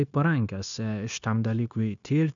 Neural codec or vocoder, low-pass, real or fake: codec, 16 kHz, 0.9 kbps, LongCat-Audio-Codec; 7.2 kHz; fake